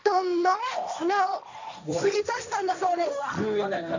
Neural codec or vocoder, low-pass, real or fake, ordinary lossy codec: codec, 16 kHz, 1.1 kbps, Voila-Tokenizer; 7.2 kHz; fake; none